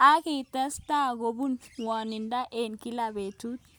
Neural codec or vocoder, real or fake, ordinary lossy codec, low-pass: none; real; none; none